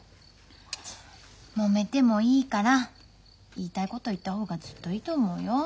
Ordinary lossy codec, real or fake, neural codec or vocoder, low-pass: none; real; none; none